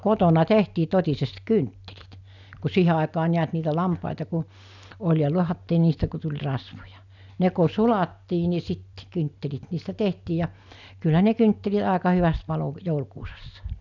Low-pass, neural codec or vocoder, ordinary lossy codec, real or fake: 7.2 kHz; none; none; real